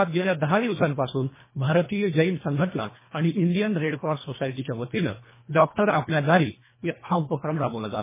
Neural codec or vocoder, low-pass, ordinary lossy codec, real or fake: codec, 24 kHz, 1.5 kbps, HILCodec; 3.6 kHz; MP3, 16 kbps; fake